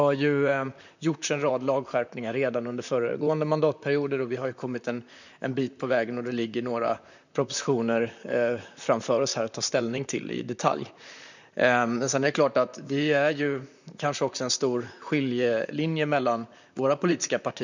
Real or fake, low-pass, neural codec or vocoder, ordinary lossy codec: fake; 7.2 kHz; vocoder, 44.1 kHz, 128 mel bands, Pupu-Vocoder; none